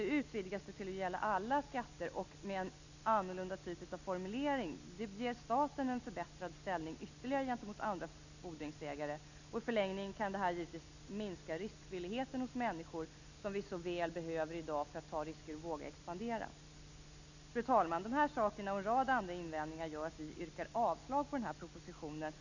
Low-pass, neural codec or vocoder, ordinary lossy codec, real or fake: 7.2 kHz; none; none; real